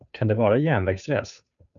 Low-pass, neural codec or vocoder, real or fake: 7.2 kHz; codec, 16 kHz, 2 kbps, FunCodec, trained on Chinese and English, 25 frames a second; fake